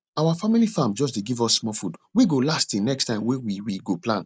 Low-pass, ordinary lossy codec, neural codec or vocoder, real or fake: none; none; none; real